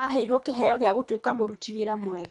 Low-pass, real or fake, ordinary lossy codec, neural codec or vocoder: 10.8 kHz; fake; none; codec, 24 kHz, 1.5 kbps, HILCodec